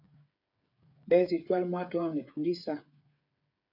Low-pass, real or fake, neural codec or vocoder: 5.4 kHz; fake; codec, 16 kHz, 8 kbps, FreqCodec, smaller model